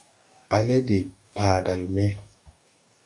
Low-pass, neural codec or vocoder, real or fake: 10.8 kHz; codec, 44.1 kHz, 2.6 kbps, DAC; fake